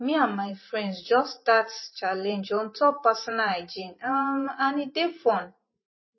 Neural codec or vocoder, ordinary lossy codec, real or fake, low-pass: vocoder, 44.1 kHz, 128 mel bands every 512 samples, BigVGAN v2; MP3, 24 kbps; fake; 7.2 kHz